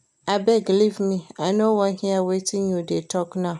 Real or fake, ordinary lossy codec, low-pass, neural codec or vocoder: real; none; none; none